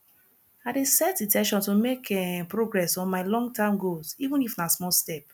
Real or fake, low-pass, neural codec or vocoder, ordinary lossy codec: real; none; none; none